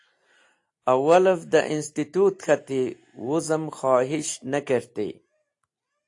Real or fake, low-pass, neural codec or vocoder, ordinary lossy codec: real; 10.8 kHz; none; AAC, 48 kbps